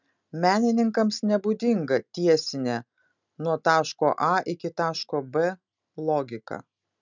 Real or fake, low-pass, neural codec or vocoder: real; 7.2 kHz; none